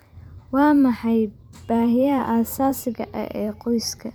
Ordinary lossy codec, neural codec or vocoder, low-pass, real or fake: none; vocoder, 44.1 kHz, 128 mel bands every 512 samples, BigVGAN v2; none; fake